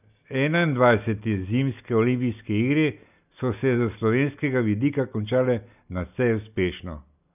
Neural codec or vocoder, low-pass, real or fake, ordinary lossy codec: none; 3.6 kHz; real; none